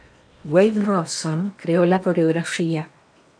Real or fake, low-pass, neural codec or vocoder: fake; 9.9 kHz; codec, 16 kHz in and 24 kHz out, 0.8 kbps, FocalCodec, streaming, 65536 codes